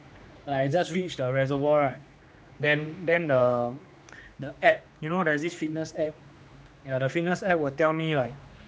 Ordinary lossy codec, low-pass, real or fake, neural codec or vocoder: none; none; fake; codec, 16 kHz, 2 kbps, X-Codec, HuBERT features, trained on general audio